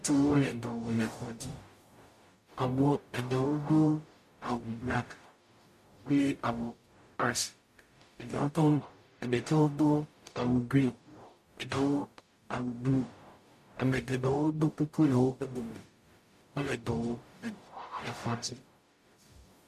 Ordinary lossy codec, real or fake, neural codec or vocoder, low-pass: MP3, 64 kbps; fake; codec, 44.1 kHz, 0.9 kbps, DAC; 14.4 kHz